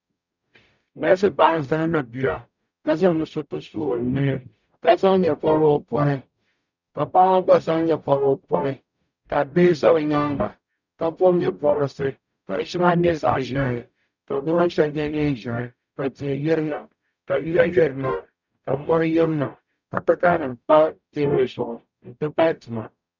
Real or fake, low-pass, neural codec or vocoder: fake; 7.2 kHz; codec, 44.1 kHz, 0.9 kbps, DAC